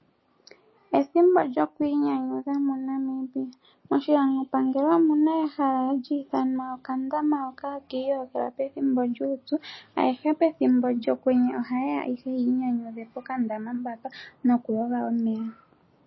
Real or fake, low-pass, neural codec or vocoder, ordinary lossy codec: real; 7.2 kHz; none; MP3, 24 kbps